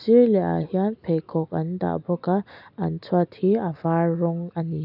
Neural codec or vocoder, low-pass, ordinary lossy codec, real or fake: none; 5.4 kHz; none; real